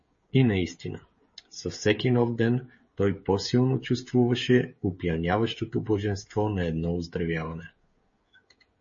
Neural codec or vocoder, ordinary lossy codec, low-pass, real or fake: codec, 16 kHz, 16 kbps, FreqCodec, smaller model; MP3, 32 kbps; 7.2 kHz; fake